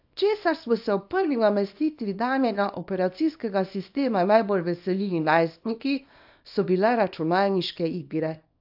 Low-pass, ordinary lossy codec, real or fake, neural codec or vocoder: 5.4 kHz; AAC, 48 kbps; fake; codec, 24 kHz, 0.9 kbps, WavTokenizer, small release